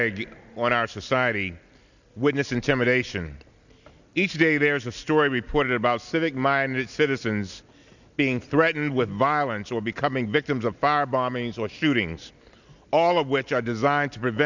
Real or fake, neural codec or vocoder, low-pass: real; none; 7.2 kHz